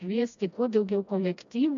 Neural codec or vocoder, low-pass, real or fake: codec, 16 kHz, 1 kbps, FreqCodec, smaller model; 7.2 kHz; fake